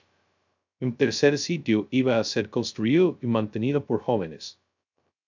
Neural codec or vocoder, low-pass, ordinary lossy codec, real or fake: codec, 16 kHz, 0.2 kbps, FocalCodec; 7.2 kHz; MP3, 64 kbps; fake